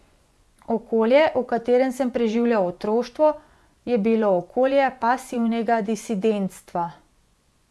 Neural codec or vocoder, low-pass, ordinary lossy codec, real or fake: vocoder, 24 kHz, 100 mel bands, Vocos; none; none; fake